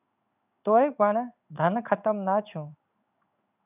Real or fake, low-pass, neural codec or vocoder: fake; 3.6 kHz; codec, 16 kHz in and 24 kHz out, 1 kbps, XY-Tokenizer